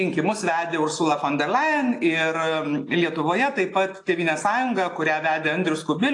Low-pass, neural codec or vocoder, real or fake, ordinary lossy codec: 10.8 kHz; none; real; AAC, 48 kbps